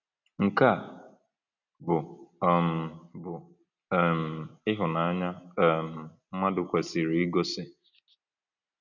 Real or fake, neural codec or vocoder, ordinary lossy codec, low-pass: real; none; none; 7.2 kHz